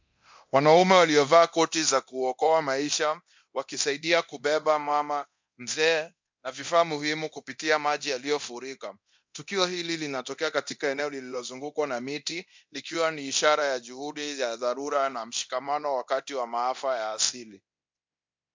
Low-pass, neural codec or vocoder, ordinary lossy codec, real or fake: 7.2 kHz; codec, 24 kHz, 0.9 kbps, DualCodec; MP3, 64 kbps; fake